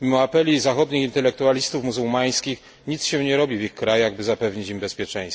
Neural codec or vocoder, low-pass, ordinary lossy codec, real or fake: none; none; none; real